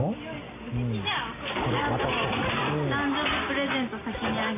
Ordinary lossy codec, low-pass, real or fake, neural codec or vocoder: AAC, 16 kbps; 3.6 kHz; real; none